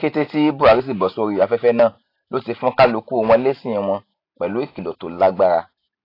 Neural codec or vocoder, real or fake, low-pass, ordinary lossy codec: none; real; 5.4 kHz; AAC, 32 kbps